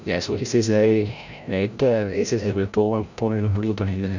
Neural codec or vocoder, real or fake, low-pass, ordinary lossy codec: codec, 16 kHz, 0.5 kbps, FreqCodec, larger model; fake; 7.2 kHz; none